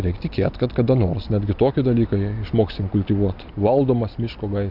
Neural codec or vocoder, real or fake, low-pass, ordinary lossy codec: none; real; 5.4 kHz; MP3, 48 kbps